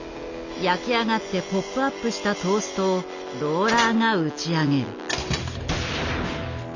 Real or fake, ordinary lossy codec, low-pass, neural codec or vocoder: real; none; 7.2 kHz; none